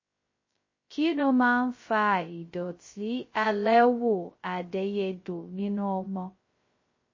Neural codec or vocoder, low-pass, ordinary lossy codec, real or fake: codec, 16 kHz, 0.2 kbps, FocalCodec; 7.2 kHz; MP3, 32 kbps; fake